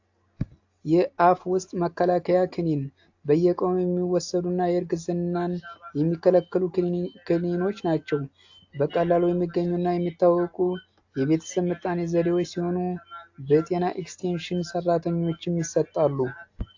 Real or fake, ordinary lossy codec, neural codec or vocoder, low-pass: real; AAC, 48 kbps; none; 7.2 kHz